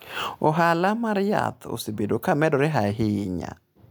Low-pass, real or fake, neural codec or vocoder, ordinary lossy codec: none; real; none; none